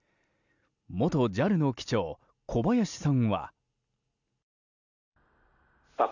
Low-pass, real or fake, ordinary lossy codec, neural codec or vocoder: 7.2 kHz; real; none; none